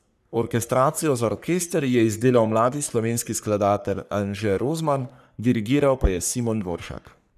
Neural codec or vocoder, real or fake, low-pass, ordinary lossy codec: codec, 44.1 kHz, 3.4 kbps, Pupu-Codec; fake; 14.4 kHz; none